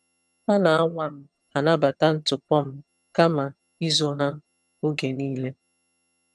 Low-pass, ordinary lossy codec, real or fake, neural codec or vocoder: none; none; fake; vocoder, 22.05 kHz, 80 mel bands, HiFi-GAN